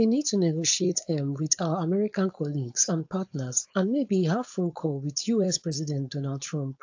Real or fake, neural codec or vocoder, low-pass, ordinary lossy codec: fake; codec, 16 kHz, 4.8 kbps, FACodec; 7.2 kHz; AAC, 48 kbps